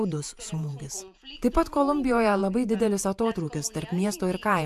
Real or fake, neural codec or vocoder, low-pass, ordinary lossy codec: fake; vocoder, 48 kHz, 128 mel bands, Vocos; 14.4 kHz; AAC, 96 kbps